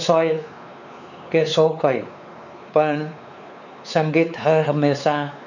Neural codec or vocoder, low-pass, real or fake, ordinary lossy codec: codec, 16 kHz, 4 kbps, X-Codec, WavLM features, trained on Multilingual LibriSpeech; 7.2 kHz; fake; none